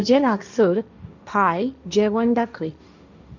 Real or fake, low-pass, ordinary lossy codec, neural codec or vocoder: fake; 7.2 kHz; none; codec, 16 kHz, 1.1 kbps, Voila-Tokenizer